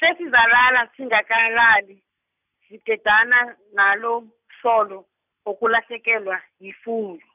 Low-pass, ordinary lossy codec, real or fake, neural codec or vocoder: 3.6 kHz; none; real; none